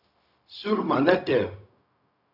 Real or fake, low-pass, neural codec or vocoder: fake; 5.4 kHz; codec, 16 kHz, 0.4 kbps, LongCat-Audio-Codec